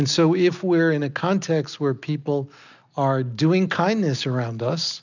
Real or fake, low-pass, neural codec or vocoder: real; 7.2 kHz; none